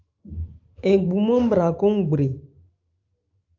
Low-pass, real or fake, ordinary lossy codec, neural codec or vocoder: 7.2 kHz; fake; Opus, 24 kbps; autoencoder, 48 kHz, 128 numbers a frame, DAC-VAE, trained on Japanese speech